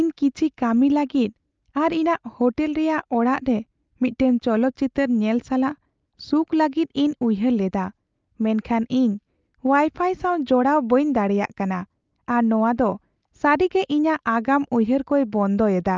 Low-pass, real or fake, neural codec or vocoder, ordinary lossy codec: 7.2 kHz; real; none; Opus, 24 kbps